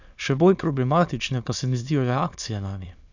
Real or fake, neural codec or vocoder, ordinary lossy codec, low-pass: fake; autoencoder, 22.05 kHz, a latent of 192 numbers a frame, VITS, trained on many speakers; none; 7.2 kHz